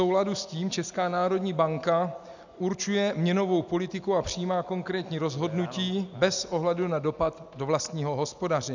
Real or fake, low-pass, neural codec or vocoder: real; 7.2 kHz; none